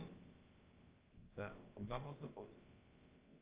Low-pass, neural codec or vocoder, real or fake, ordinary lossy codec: 3.6 kHz; codec, 16 kHz, about 1 kbps, DyCAST, with the encoder's durations; fake; Opus, 24 kbps